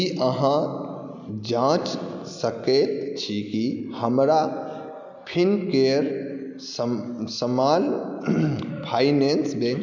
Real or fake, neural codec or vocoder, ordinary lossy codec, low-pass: real; none; none; 7.2 kHz